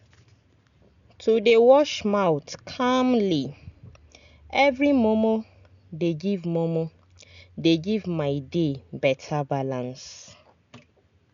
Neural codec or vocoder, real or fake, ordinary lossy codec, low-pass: none; real; none; 7.2 kHz